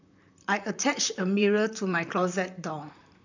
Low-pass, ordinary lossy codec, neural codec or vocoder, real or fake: 7.2 kHz; none; vocoder, 44.1 kHz, 128 mel bands, Pupu-Vocoder; fake